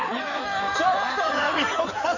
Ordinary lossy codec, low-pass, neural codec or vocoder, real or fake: none; 7.2 kHz; codec, 16 kHz in and 24 kHz out, 2.2 kbps, FireRedTTS-2 codec; fake